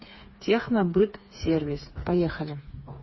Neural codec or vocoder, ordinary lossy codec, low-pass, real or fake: codec, 16 kHz, 4 kbps, FreqCodec, smaller model; MP3, 24 kbps; 7.2 kHz; fake